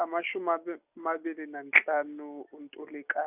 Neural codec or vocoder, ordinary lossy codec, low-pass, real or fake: none; none; 3.6 kHz; real